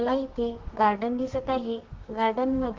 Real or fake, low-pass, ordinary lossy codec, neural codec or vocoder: fake; 7.2 kHz; Opus, 16 kbps; codec, 32 kHz, 1.9 kbps, SNAC